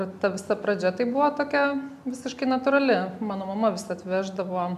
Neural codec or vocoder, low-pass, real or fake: none; 14.4 kHz; real